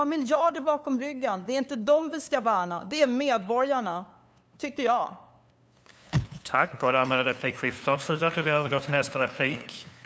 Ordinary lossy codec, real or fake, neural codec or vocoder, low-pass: none; fake; codec, 16 kHz, 2 kbps, FunCodec, trained on LibriTTS, 25 frames a second; none